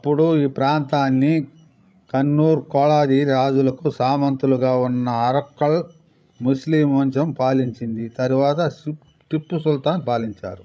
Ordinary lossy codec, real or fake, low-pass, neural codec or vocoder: none; fake; none; codec, 16 kHz, 16 kbps, FreqCodec, larger model